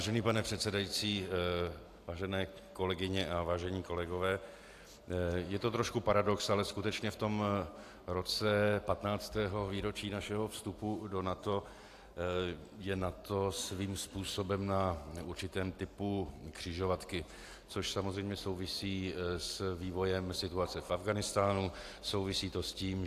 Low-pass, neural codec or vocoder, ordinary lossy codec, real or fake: 14.4 kHz; none; AAC, 64 kbps; real